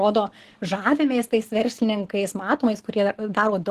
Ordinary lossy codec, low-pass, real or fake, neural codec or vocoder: Opus, 16 kbps; 14.4 kHz; real; none